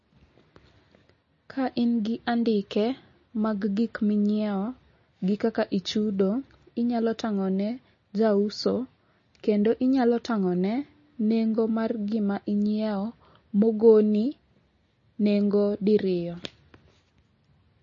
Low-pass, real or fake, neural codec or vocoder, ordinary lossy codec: 7.2 kHz; real; none; MP3, 32 kbps